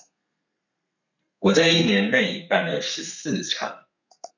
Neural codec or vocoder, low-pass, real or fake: codec, 32 kHz, 1.9 kbps, SNAC; 7.2 kHz; fake